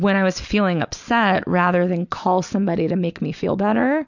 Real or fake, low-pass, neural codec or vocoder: real; 7.2 kHz; none